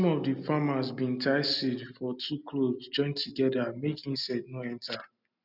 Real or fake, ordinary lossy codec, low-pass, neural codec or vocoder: real; none; 5.4 kHz; none